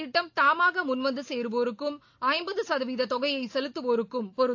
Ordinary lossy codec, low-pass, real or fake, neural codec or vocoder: AAC, 48 kbps; 7.2 kHz; real; none